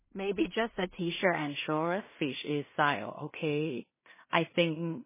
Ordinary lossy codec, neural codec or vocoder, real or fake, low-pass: MP3, 16 kbps; codec, 16 kHz in and 24 kHz out, 0.4 kbps, LongCat-Audio-Codec, two codebook decoder; fake; 3.6 kHz